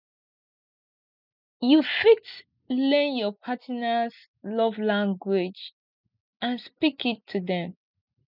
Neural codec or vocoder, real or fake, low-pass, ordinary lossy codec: none; real; 5.4 kHz; none